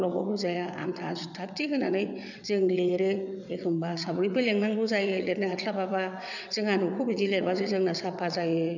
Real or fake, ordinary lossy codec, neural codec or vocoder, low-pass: fake; none; vocoder, 22.05 kHz, 80 mel bands, WaveNeXt; 7.2 kHz